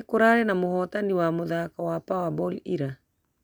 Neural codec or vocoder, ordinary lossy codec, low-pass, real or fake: none; none; 19.8 kHz; real